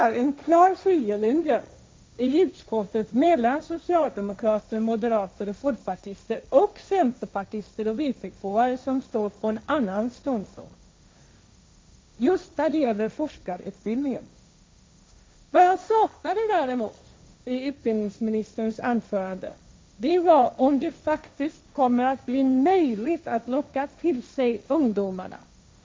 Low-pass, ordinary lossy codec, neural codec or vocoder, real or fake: none; none; codec, 16 kHz, 1.1 kbps, Voila-Tokenizer; fake